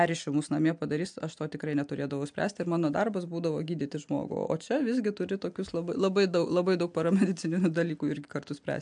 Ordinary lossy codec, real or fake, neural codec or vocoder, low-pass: MP3, 64 kbps; real; none; 9.9 kHz